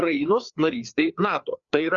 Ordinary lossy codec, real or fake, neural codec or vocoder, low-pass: Opus, 64 kbps; fake; codec, 16 kHz, 4 kbps, FreqCodec, larger model; 7.2 kHz